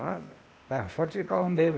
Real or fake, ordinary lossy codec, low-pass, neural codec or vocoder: fake; none; none; codec, 16 kHz, 0.8 kbps, ZipCodec